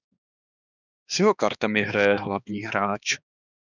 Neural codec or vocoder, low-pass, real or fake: codec, 16 kHz, 2 kbps, X-Codec, HuBERT features, trained on balanced general audio; 7.2 kHz; fake